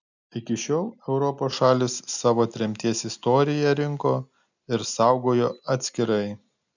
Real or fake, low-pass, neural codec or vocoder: real; 7.2 kHz; none